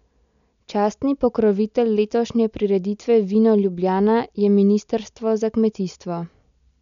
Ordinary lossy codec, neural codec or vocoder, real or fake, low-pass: none; none; real; 7.2 kHz